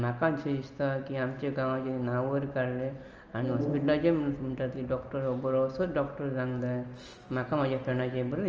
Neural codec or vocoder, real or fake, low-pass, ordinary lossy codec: none; real; 7.2 kHz; Opus, 24 kbps